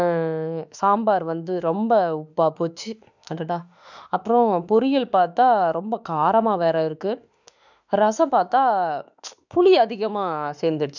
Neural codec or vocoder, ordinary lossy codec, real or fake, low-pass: autoencoder, 48 kHz, 32 numbers a frame, DAC-VAE, trained on Japanese speech; none; fake; 7.2 kHz